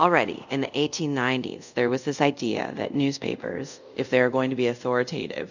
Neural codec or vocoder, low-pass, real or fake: codec, 24 kHz, 0.5 kbps, DualCodec; 7.2 kHz; fake